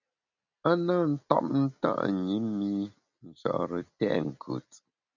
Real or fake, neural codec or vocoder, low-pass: real; none; 7.2 kHz